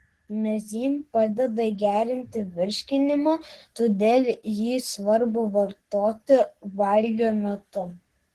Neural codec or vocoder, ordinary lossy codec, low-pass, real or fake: codec, 44.1 kHz, 3.4 kbps, Pupu-Codec; Opus, 16 kbps; 14.4 kHz; fake